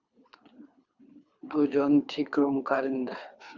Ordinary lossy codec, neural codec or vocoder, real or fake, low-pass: Opus, 64 kbps; codec, 24 kHz, 3 kbps, HILCodec; fake; 7.2 kHz